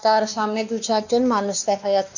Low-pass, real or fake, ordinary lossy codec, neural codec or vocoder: 7.2 kHz; fake; none; codec, 16 kHz, 2 kbps, X-Codec, WavLM features, trained on Multilingual LibriSpeech